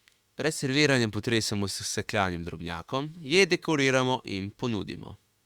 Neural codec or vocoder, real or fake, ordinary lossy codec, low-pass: autoencoder, 48 kHz, 32 numbers a frame, DAC-VAE, trained on Japanese speech; fake; Opus, 64 kbps; 19.8 kHz